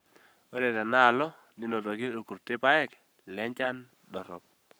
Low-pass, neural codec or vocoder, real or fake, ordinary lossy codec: none; codec, 44.1 kHz, 7.8 kbps, Pupu-Codec; fake; none